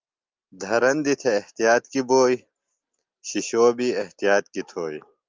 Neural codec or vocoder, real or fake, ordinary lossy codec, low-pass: none; real; Opus, 24 kbps; 7.2 kHz